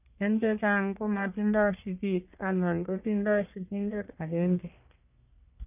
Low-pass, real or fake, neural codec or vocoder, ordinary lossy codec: 3.6 kHz; fake; codec, 44.1 kHz, 1.7 kbps, Pupu-Codec; none